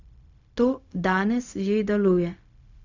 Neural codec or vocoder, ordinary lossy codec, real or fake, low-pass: codec, 16 kHz, 0.4 kbps, LongCat-Audio-Codec; none; fake; 7.2 kHz